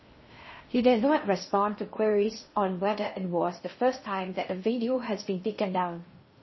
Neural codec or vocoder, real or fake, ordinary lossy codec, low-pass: codec, 16 kHz in and 24 kHz out, 0.6 kbps, FocalCodec, streaming, 4096 codes; fake; MP3, 24 kbps; 7.2 kHz